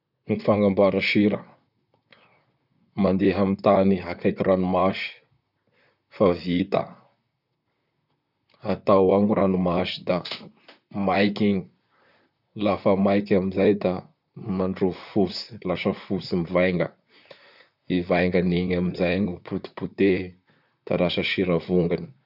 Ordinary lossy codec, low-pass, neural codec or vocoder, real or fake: none; 5.4 kHz; vocoder, 44.1 kHz, 128 mel bands, Pupu-Vocoder; fake